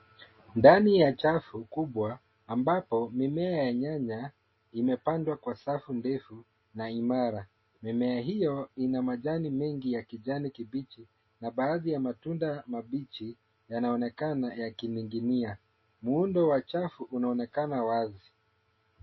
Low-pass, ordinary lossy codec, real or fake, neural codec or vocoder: 7.2 kHz; MP3, 24 kbps; real; none